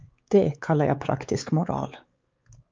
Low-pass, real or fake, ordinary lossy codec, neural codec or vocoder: 7.2 kHz; fake; Opus, 24 kbps; codec, 16 kHz, 4 kbps, X-Codec, WavLM features, trained on Multilingual LibriSpeech